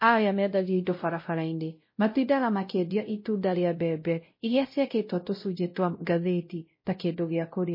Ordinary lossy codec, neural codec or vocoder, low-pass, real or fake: MP3, 24 kbps; codec, 16 kHz, 0.5 kbps, X-Codec, WavLM features, trained on Multilingual LibriSpeech; 5.4 kHz; fake